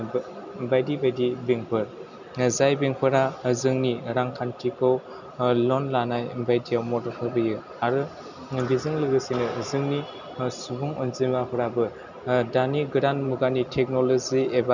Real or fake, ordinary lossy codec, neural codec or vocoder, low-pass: real; none; none; 7.2 kHz